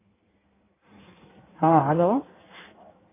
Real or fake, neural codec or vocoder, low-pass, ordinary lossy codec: fake; codec, 16 kHz in and 24 kHz out, 1.1 kbps, FireRedTTS-2 codec; 3.6 kHz; AAC, 16 kbps